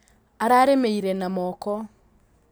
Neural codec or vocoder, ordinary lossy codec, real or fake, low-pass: none; none; real; none